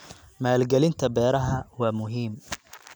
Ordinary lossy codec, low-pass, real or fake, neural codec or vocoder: none; none; real; none